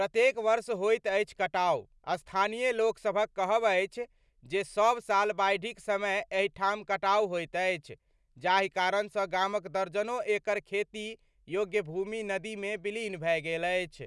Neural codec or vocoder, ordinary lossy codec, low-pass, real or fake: none; none; none; real